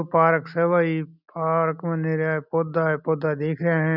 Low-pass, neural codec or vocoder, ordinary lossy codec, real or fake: 5.4 kHz; none; none; real